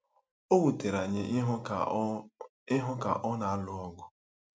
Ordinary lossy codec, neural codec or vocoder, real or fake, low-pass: none; none; real; none